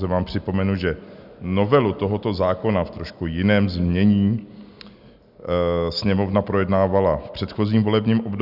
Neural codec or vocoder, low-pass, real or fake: none; 5.4 kHz; real